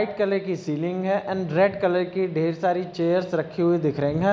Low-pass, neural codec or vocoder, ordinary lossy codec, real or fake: none; none; none; real